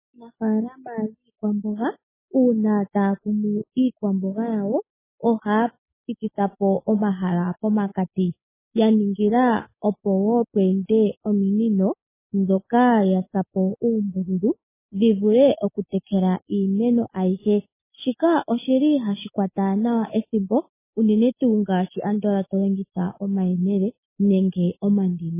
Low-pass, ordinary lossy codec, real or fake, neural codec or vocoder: 3.6 kHz; MP3, 16 kbps; real; none